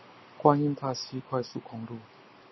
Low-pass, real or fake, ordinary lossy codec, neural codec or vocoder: 7.2 kHz; real; MP3, 24 kbps; none